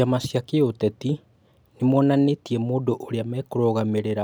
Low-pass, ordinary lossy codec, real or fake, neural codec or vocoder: none; none; real; none